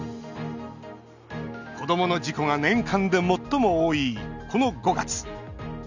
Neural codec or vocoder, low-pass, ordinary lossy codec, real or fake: none; 7.2 kHz; none; real